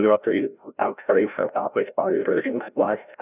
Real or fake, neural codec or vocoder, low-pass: fake; codec, 16 kHz, 0.5 kbps, FreqCodec, larger model; 3.6 kHz